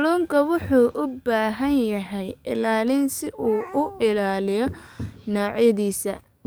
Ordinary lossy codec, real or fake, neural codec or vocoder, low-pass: none; fake; codec, 44.1 kHz, 7.8 kbps, DAC; none